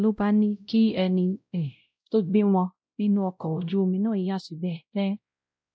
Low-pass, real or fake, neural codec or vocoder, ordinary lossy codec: none; fake; codec, 16 kHz, 0.5 kbps, X-Codec, WavLM features, trained on Multilingual LibriSpeech; none